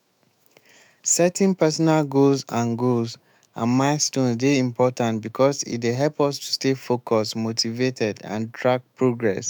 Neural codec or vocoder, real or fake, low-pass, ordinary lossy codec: autoencoder, 48 kHz, 128 numbers a frame, DAC-VAE, trained on Japanese speech; fake; none; none